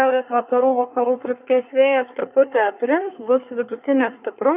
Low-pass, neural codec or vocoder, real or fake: 3.6 kHz; codec, 24 kHz, 1 kbps, SNAC; fake